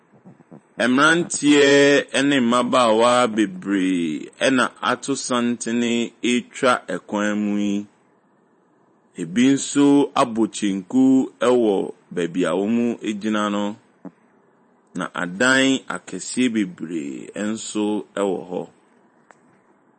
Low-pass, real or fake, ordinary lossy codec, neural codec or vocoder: 10.8 kHz; fake; MP3, 32 kbps; vocoder, 44.1 kHz, 128 mel bands every 512 samples, BigVGAN v2